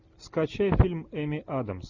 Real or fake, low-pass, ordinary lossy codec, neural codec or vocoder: real; 7.2 kHz; Opus, 64 kbps; none